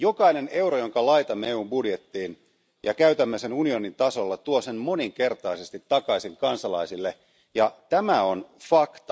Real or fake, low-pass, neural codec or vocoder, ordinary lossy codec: real; none; none; none